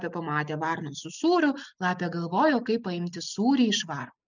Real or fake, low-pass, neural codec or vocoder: real; 7.2 kHz; none